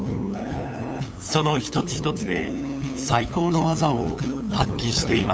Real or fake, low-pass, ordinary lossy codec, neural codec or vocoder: fake; none; none; codec, 16 kHz, 8 kbps, FunCodec, trained on LibriTTS, 25 frames a second